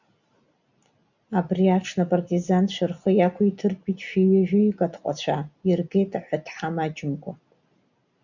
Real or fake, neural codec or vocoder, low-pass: real; none; 7.2 kHz